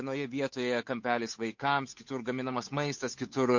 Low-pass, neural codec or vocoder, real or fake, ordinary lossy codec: 7.2 kHz; codec, 16 kHz, 8 kbps, FunCodec, trained on Chinese and English, 25 frames a second; fake; MP3, 48 kbps